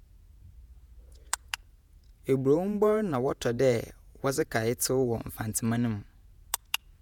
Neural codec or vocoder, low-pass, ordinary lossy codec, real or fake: vocoder, 48 kHz, 128 mel bands, Vocos; 19.8 kHz; none; fake